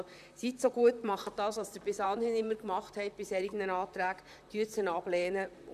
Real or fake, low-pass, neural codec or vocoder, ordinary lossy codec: fake; 14.4 kHz; vocoder, 44.1 kHz, 128 mel bands, Pupu-Vocoder; none